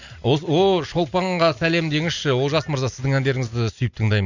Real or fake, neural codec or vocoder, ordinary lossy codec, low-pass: real; none; none; 7.2 kHz